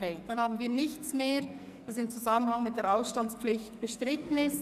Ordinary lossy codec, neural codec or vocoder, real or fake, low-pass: none; codec, 44.1 kHz, 2.6 kbps, SNAC; fake; 14.4 kHz